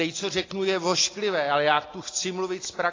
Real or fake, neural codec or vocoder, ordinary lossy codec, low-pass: real; none; AAC, 32 kbps; 7.2 kHz